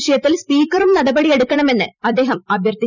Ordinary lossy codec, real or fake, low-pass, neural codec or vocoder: none; fake; 7.2 kHz; vocoder, 44.1 kHz, 128 mel bands every 256 samples, BigVGAN v2